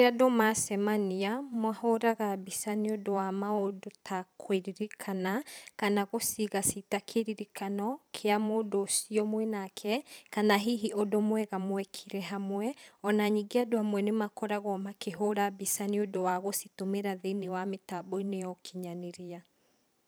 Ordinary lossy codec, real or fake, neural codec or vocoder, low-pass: none; fake; vocoder, 44.1 kHz, 128 mel bands every 512 samples, BigVGAN v2; none